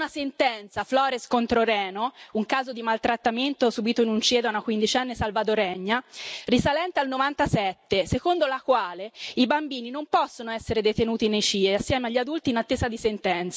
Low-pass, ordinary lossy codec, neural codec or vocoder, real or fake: none; none; none; real